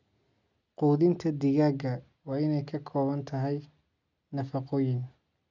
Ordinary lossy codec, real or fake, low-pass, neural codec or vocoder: none; real; 7.2 kHz; none